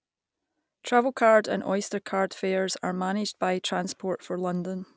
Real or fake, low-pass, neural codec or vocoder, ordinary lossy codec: real; none; none; none